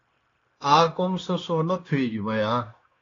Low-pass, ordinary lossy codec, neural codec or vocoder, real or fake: 7.2 kHz; AAC, 32 kbps; codec, 16 kHz, 0.9 kbps, LongCat-Audio-Codec; fake